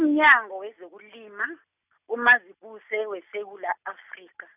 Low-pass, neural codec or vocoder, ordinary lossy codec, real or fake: 3.6 kHz; none; none; real